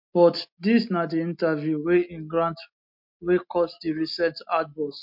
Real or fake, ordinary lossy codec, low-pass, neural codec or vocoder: real; MP3, 48 kbps; 5.4 kHz; none